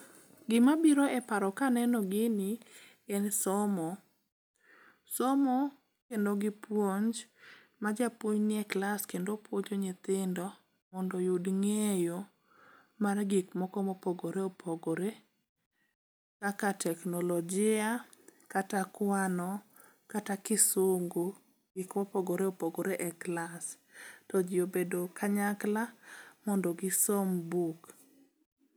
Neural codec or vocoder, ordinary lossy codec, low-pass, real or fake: none; none; none; real